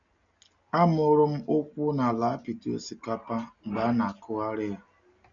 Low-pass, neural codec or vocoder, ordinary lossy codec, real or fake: 7.2 kHz; none; none; real